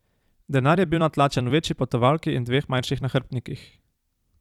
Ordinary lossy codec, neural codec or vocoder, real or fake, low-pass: none; vocoder, 44.1 kHz, 128 mel bands every 256 samples, BigVGAN v2; fake; 19.8 kHz